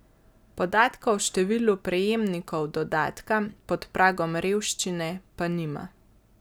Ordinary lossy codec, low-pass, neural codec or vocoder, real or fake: none; none; none; real